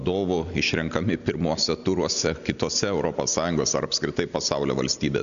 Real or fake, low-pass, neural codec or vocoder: real; 7.2 kHz; none